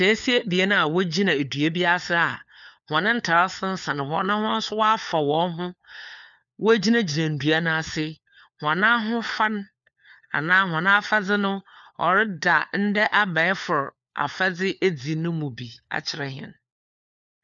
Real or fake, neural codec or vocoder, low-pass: fake; codec, 16 kHz, 4 kbps, FunCodec, trained on LibriTTS, 50 frames a second; 7.2 kHz